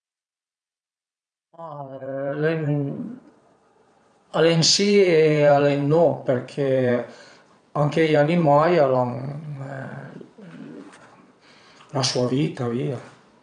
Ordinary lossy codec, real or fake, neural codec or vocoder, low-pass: none; fake; vocoder, 22.05 kHz, 80 mel bands, Vocos; 9.9 kHz